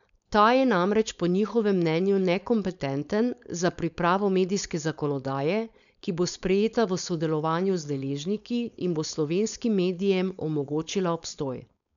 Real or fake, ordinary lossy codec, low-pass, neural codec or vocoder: fake; none; 7.2 kHz; codec, 16 kHz, 4.8 kbps, FACodec